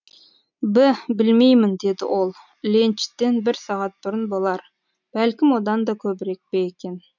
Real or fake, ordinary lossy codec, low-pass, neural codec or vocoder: real; none; 7.2 kHz; none